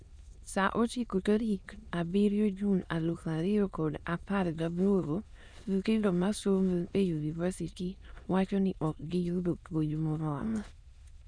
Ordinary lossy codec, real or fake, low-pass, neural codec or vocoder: none; fake; 9.9 kHz; autoencoder, 22.05 kHz, a latent of 192 numbers a frame, VITS, trained on many speakers